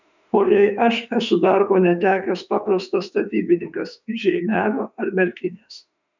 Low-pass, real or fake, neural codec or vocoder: 7.2 kHz; fake; autoencoder, 48 kHz, 32 numbers a frame, DAC-VAE, trained on Japanese speech